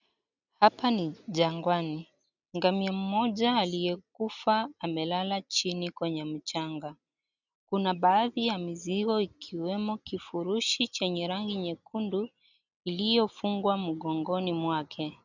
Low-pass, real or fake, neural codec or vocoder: 7.2 kHz; real; none